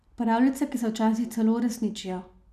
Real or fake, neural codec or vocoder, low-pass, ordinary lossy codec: real; none; 14.4 kHz; none